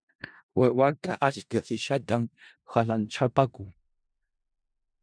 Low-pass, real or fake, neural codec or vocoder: 9.9 kHz; fake; codec, 16 kHz in and 24 kHz out, 0.4 kbps, LongCat-Audio-Codec, four codebook decoder